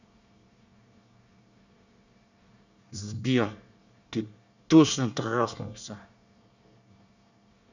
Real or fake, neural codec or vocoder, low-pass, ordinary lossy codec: fake; codec, 24 kHz, 1 kbps, SNAC; 7.2 kHz; none